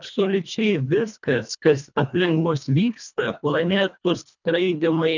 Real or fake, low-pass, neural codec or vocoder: fake; 7.2 kHz; codec, 24 kHz, 1.5 kbps, HILCodec